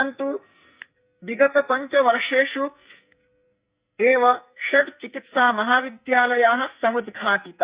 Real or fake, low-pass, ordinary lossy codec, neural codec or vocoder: fake; 3.6 kHz; Opus, 64 kbps; codec, 44.1 kHz, 2.6 kbps, SNAC